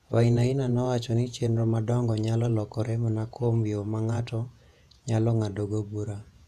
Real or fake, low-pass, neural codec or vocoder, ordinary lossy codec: fake; 14.4 kHz; vocoder, 48 kHz, 128 mel bands, Vocos; none